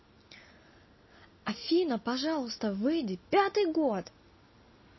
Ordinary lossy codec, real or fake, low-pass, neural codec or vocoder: MP3, 24 kbps; real; 7.2 kHz; none